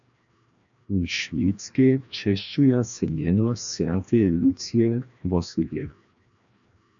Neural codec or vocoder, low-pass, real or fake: codec, 16 kHz, 1 kbps, FreqCodec, larger model; 7.2 kHz; fake